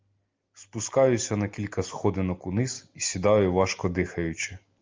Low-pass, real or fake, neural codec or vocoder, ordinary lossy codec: 7.2 kHz; real; none; Opus, 32 kbps